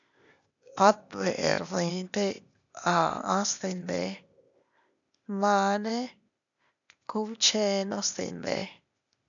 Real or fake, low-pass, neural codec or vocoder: fake; 7.2 kHz; codec, 16 kHz, 0.8 kbps, ZipCodec